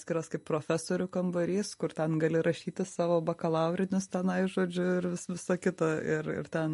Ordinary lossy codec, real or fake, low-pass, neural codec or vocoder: MP3, 48 kbps; real; 14.4 kHz; none